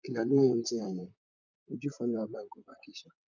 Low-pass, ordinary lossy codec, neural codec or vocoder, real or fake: 7.2 kHz; none; vocoder, 44.1 kHz, 128 mel bands, Pupu-Vocoder; fake